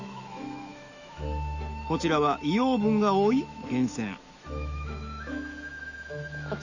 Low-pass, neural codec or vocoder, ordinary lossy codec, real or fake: 7.2 kHz; autoencoder, 48 kHz, 128 numbers a frame, DAC-VAE, trained on Japanese speech; none; fake